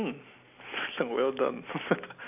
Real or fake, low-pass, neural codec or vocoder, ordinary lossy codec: real; 3.6 kHz; none; none